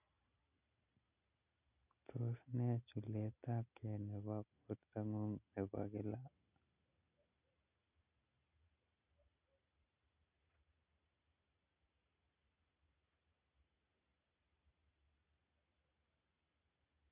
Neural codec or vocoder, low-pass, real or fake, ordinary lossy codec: none; 3.6 kHz; real; none